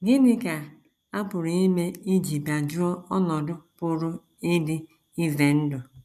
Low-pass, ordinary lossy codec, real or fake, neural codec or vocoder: 14.4 kHz; none; real; none